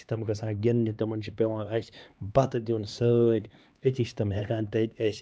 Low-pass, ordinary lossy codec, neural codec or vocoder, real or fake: none; none; codec, 16 kHz, 2 kbps, X-Codec, HuBERT features, trained on LibriSpeech; fake